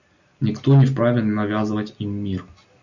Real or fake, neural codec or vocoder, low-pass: real; none; 7.2 kHz